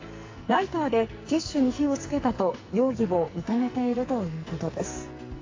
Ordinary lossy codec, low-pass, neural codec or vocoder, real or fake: AAC, 32 kbps; 7.2 kHz; codec, 44.1 kHz, 2.6 kbps, SNAC; fake